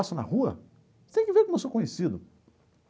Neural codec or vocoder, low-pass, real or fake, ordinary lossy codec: none; none; real; none